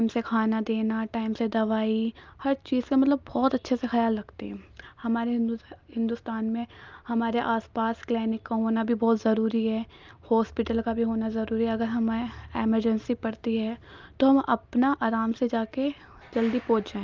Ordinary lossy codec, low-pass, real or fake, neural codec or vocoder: Opus, 24 kbps; 7.2 kHz; real; none